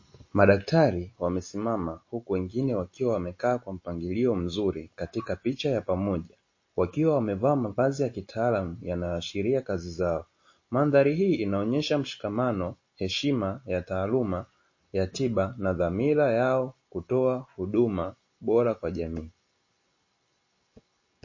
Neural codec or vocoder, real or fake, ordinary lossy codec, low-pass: none; real; MP3, 32 kbps; 7.2 kHz